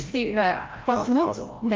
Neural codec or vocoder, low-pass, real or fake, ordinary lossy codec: codec, 16 kHz, 0.5 kbps, FreqCodec, larger model; 7.2 kHz; fake; Opus, 32 kbps